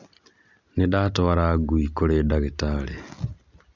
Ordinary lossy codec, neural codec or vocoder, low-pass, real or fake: none; none; 7.2 kHz; real